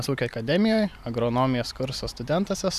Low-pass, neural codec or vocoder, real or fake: 14.4 kHz; vocoder, 44.1 kHz, 128 mel bands every 512 samples, BigVGAN v2; fake